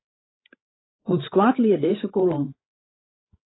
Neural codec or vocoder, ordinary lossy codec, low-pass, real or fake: codec, 16 kHz, 8 kbps, FreqCodec, larger model; AAC, 16 kbps; 7.2 kHz; fake